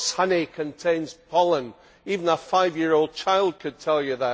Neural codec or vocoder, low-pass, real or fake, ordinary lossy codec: none; none; real; none